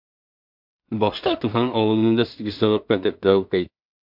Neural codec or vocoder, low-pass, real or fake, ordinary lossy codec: codec, 16 kHz in and 24 kHz out, 0.4 kbps, LongCat-Audio-Codec, two codebook decoder; 5.4 kHz; fake; MP3, 48 kbps